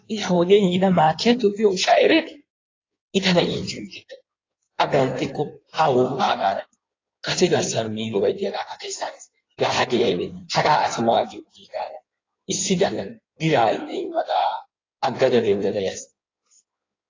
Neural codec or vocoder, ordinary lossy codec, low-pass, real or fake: codec, 16 kHz in and 24 kHz out, 1.1 kbps, FireRedTTS-2 codec; AAC, 32 kbps; 7.2 kHz; fake